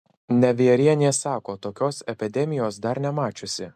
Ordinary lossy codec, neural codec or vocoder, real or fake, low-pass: MP3, 96 kbps; none; real; 14.4 kHz